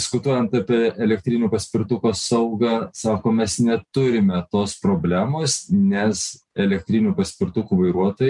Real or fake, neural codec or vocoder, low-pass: real; none; 10.8 kHz